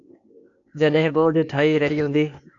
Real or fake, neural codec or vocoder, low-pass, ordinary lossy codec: fake; codec, 16 kHz, 0.8 kbps, ZipCodec; 7.2 kHz; AAC, 64 kbps